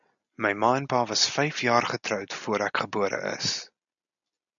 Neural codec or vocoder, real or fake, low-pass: none; real; 7.2 kHz